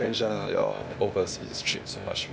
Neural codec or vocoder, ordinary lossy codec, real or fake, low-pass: codec, 16 kHz, 0.8 kbps, ZipCodec; none; fake; none